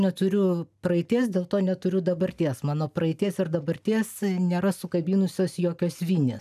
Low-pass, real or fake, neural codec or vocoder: 14.4 kHz; real; none